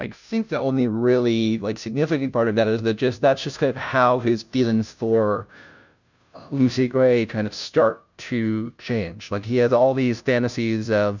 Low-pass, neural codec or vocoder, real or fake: 7.2 kHz; codec, 16 kHz, 0.5 kbps, FunCodec, trained on Chinese and English, 25 frames a second; fake